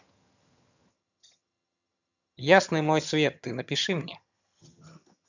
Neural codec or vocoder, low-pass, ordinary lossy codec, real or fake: vocoder, 22.05 kHz, 80 mel bands, HiFi-GAN; 7.2 kHz; none; fake